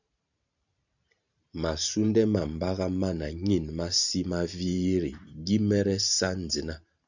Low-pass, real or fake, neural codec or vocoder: 7.2 kHz; fake; vocoder, 44.1 kHz, 128 mel bands every 512 samples, BigVGAN v2